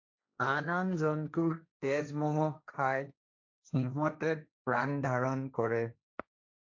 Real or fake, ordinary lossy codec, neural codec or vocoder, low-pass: fake; AAC, 48 kbps; codec, 16 kHz, 1.1 kbps, Voila-Tokenizer; 7.2 kHz